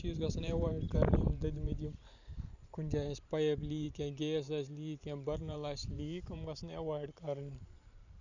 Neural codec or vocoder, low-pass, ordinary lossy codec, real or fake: vocoder, 44.1 kHz, 128 mel bands every 512 samples, BigVGAN v2; 7.2 kHz; none; fake